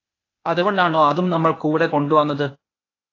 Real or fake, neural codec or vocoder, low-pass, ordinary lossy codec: fake; codec, 16 kHz, 0.8 kbps, ZipCodec; 7.2 kHz; AAC, 32 kbps